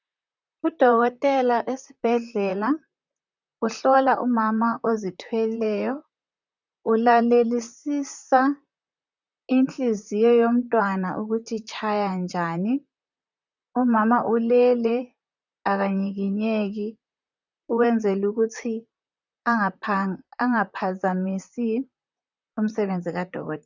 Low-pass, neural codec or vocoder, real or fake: 7.2 kHz; vocoder, 44.1 kHz, 128 mel bands, Pupu-Vocoder; fake